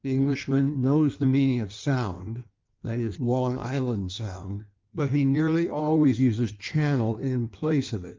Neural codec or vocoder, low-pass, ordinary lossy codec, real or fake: codec, 16 kHz in and 24 kHz out, 1.1 kbps, FireRedTTS-2 codec; 7.2 kHz; Opus, 32 kbps; fake